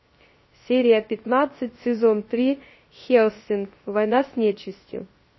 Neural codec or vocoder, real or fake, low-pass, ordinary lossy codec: codec, 16 kHz, 0.3 kbps, FocalCodec; fake; 7.2 kHz; MP3, 24 kbps